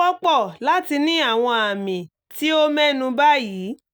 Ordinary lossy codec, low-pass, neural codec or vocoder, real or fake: none; none; none; real